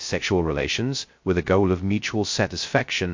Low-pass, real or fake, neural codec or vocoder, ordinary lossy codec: 7.2 kHz; fake; codec, 16 kHz, 0.2 kbps, FocalCodec; MP3, 48 kbps